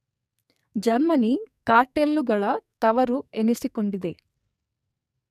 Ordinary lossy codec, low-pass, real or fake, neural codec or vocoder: none; 14.4 kHz; fake; codec, 44.1 kHz, 2.6 kbps, SNAC